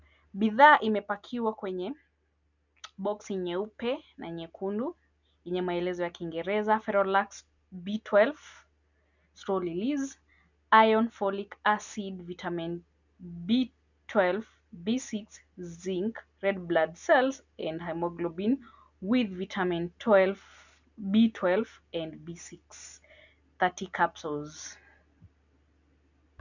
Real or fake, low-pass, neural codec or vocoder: real; 7.2 kHz; none